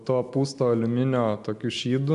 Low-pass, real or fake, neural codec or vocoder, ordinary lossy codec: 10.8 kHz; real; none; AAC, 96 kbps